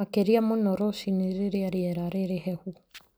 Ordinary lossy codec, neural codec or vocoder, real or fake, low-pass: none; none; real; none